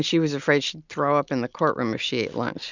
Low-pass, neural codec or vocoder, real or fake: 7.2 kHz; none; real